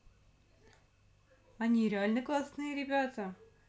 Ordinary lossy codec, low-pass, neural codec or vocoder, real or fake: none; none; none; real